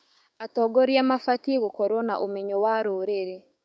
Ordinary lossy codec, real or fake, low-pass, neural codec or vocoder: none; fake; none; codec, 16 kHz, 6 kbps, DAC